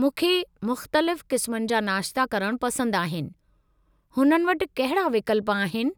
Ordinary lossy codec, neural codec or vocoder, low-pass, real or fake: none; none; none; real